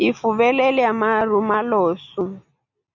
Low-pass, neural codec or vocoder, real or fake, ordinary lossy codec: 7.2 kHz; none; real; MP3, 64 kbps